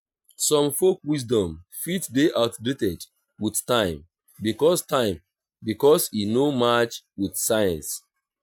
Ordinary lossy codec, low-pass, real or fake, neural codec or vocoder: none; none; real; none